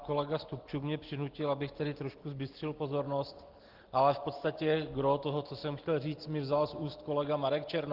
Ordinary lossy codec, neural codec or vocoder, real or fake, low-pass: Opus, 16 kbps; none; real; 5.4 kHz